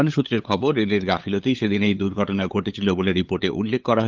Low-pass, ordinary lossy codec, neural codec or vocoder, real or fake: 7.2 kHz; Opus, 32 kbps; codec, 16 kHz, 8 kbps, FunCodec, trained on LibriTTS, 25 frames a second; fake